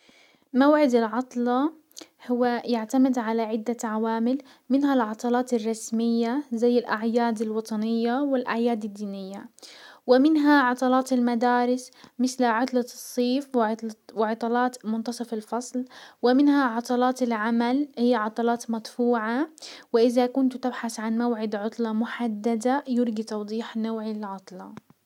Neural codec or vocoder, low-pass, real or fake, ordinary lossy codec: none; 19.8 kHz; real; none